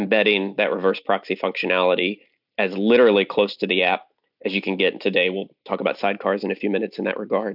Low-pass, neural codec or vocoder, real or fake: 5.4 kHz; none; real